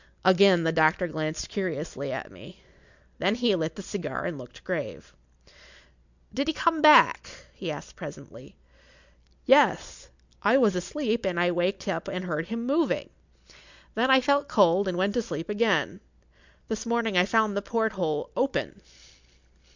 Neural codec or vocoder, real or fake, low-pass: none; real; 7.2 kHz